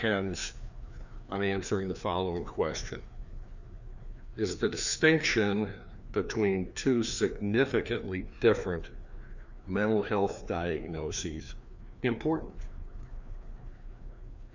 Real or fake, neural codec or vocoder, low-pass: fake; codec, 16 kHz, 2 kbps, FreqCodec, larger model; 7.2 kHz